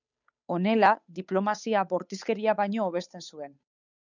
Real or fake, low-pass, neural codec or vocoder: fake; 7.2 kHz; codec, 16 kHz, 8 kbps, FunCodec, trained on Chinese and English, 25 frames a second